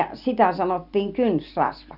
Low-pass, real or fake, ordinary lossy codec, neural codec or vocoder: 5.4 kHz; real; none; none